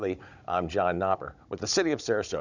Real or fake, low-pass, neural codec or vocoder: fake; 7.2 kHz; codec, 16 kHz, 16 kbps, FunCodec, trained on Chinese and English, 50 frames a second